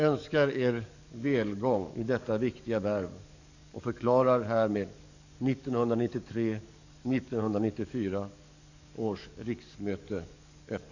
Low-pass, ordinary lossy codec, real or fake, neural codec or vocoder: 7.2 kHz; none; fake; codec, 44.1 kHz, 7.8 kbps, DAC